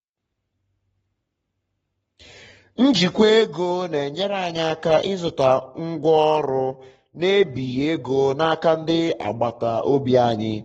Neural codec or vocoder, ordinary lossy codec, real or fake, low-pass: codec, 44.1 kHz, 7.8 kbps, Pupu-Codec; AAC, 24 kbps; fake; 19.8 kHz